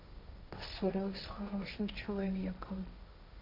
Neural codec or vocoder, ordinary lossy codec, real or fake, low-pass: codec, 16 kHz, 1.1 kbps, Voila-Tokenizer; MP3, 48 kbps; fake; 5.4 kHz